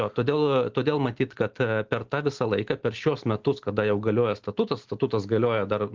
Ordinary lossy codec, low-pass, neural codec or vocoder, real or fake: Opus, 16 kbps; 7.2 kHz; none; real